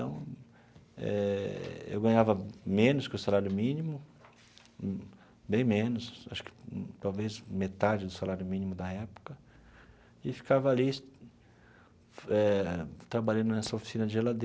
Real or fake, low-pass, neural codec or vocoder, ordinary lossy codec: real; none; none; none